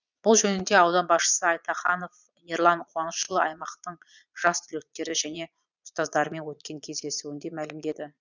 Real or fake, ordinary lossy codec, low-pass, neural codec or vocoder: fake; none; 7.2 kHz; vocoder, 22.05 kHz, 80 mel bands, Vocos